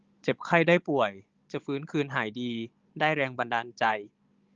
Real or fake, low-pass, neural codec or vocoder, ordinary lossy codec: fake; 7.2 kHz; codec, 16 kHz, 16 kbps, FunCodec, trained on Chinese and English, 50 frames a second; Opus, 24 kbps